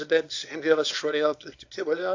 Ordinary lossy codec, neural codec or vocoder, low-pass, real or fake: AAC, 48 kbps; codec, 24 kHz, 0.9 kbps, WavTokenizer, small release; 7.2 kHz; fake